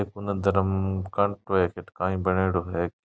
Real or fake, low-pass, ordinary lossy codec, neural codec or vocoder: real; none; none; none